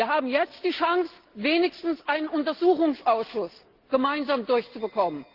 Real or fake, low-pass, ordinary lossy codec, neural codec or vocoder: real; 5.4 kHz; Opus, 16 kbps; none